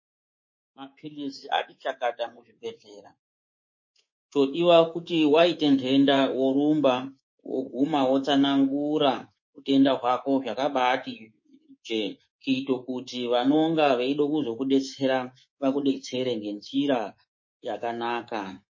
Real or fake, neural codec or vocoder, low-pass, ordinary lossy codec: fake; codec, 24 kHz, 3.1 kbps, DualCodec; 7.2 kHz; MP3, 32 kbps